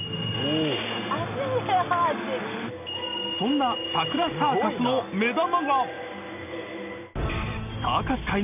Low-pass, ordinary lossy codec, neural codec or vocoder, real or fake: 3.6 kHz; none; none; real